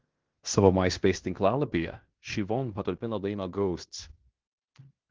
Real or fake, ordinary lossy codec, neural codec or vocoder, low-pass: fake; Opus, 16 kbps; codec, 16 kHz in and 24 kHz out, 0.9 kbps, LongCat-Audio-Codec, fine tuned four codebook decoder; 7.2 kHz